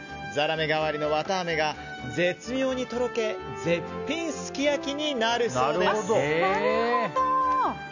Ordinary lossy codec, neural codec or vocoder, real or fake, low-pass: none; none; real; 7.2 kHz